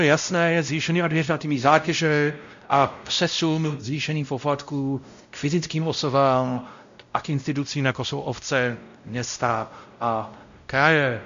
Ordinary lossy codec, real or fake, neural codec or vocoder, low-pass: MP3, 64 kbps; fake; codec, 16 kHz, 0.5 kbps, X-Codec, WavLM features, trained on Multilingual LibriSpeech; 7.2 kHz